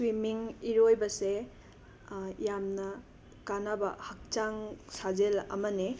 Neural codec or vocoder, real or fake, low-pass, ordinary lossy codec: none; real; none; none